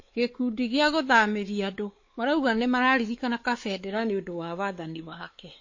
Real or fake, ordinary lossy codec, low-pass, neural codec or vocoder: fake; MP3, 32 kbps; 7.2 kHz; codec, 16 kHz, 4 kbps, X-Codec, WavLM features, trained on Multilingual LibriSpeech